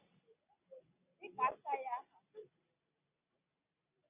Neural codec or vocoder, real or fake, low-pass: none; real; 3.6 kHz